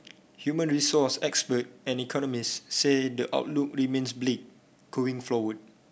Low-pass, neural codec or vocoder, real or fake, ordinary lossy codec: none; none; real; none